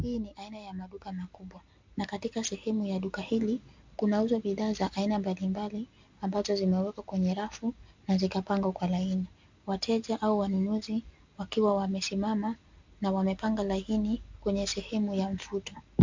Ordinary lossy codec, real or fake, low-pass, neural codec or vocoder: MP3, 64 kbps; real; 7.2 kHz; none